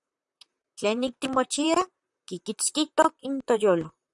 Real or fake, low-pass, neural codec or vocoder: fake; 10.8 kHz; vocoder, 44.1 kHz, 128 mel bands, Pupu-Vocoder